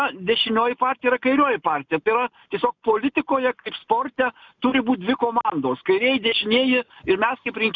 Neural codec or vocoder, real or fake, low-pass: none; real; 7.2 kHz